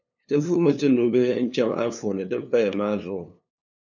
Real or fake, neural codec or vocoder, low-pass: fake; codec, 16 kHz, 2 kbps, FunCodec, trained on LibriTTS, 25 frames a second; 7.2 kHz